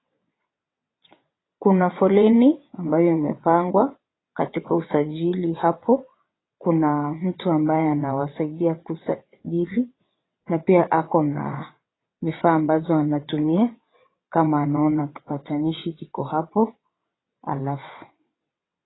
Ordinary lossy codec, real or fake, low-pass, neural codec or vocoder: AAC, 16 kbps; fake; 7.2 kHz; vocoder, 22.05 kHz, 80 mel bands, WaveNeXt